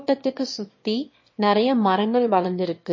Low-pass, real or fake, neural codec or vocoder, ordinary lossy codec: 7.2 kHz; fake; autoencoder, 22.05 kHz, a latent of 192 numbers a frame, VITS, trained on one speaker; MP3, 32 kbps